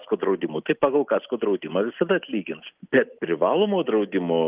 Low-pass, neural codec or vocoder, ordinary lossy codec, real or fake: 3.6 kHz; none; Opus, 32 kbps; real